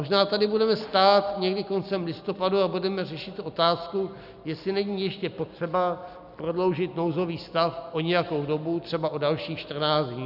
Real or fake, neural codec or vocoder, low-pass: real; none; 5.4 kHz